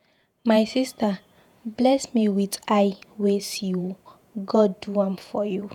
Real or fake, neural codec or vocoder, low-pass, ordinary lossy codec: fake; vocoder, 48 kHz, 128 mel bands, Vocos; 19.8 kHz; none